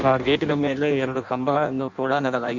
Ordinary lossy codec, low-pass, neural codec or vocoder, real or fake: none; 7.2 kHz; codec, 16 kHz in and 24 kHz out, 0.6 kbps, FireRedTTS-2 codec; fake